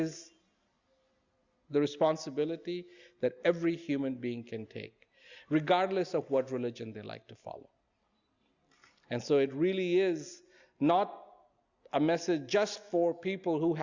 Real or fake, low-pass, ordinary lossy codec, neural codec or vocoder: real; 7.2 kHz; Opus, 64 kbps; none